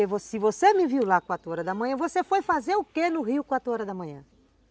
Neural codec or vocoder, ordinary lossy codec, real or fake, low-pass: none; none; real; none